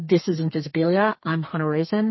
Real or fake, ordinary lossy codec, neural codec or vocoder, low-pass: fake; MP3, 24 kbps; codec, 32 kHz, 1.9 kbps, SNAC; 7.2 kHz